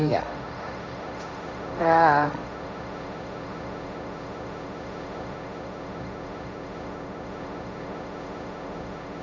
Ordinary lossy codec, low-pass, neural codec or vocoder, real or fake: none; none; codec, 16 kHz, 1.1 kbps, Voila-Tokenizer; fake